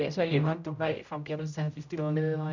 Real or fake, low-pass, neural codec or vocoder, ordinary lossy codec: fake; 7.2 kHz; codec, 16 kHz, 0.5 kbps, X-Codec, HuBERT features, trained on general audio; none